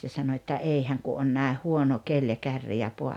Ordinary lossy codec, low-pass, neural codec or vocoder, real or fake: none; 19.8 kHz; none; real